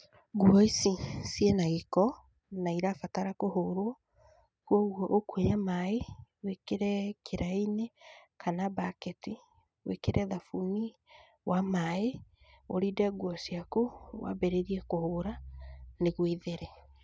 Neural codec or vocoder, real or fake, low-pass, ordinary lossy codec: none; real; none; none